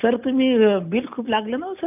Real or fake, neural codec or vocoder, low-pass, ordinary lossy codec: real; none; 3.6 kHz; none